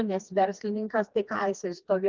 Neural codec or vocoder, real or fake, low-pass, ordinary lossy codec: codec, 16 kHz, 2 kbps, FreqCodec, smaller model; fake; 7.2 kHz; Opus, 24 kbps